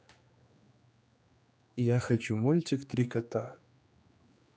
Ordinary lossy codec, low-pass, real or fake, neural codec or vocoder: none; none; fake; codec, 16 kHz, 2 kbps, X-Codec, HuBERT features, trained on general audio